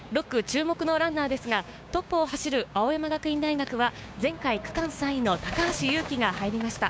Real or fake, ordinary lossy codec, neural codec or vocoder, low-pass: fake; none; codec, 16 kHz, 6 kbps, DAC; none